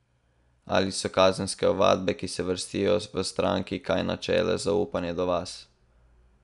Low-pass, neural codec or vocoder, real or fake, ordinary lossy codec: 10.8 kHz; none; real; none